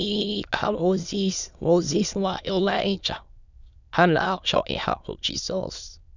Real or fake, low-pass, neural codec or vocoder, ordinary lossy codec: fake; 7.2 kHz; autoencoder, 22.05 kHz, a latent of 192 numbers a frame, VITS, trained on many speakers; none